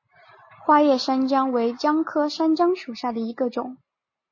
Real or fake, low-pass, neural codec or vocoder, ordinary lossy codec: real; 7.2 kHz; none; MP3, 32 kbps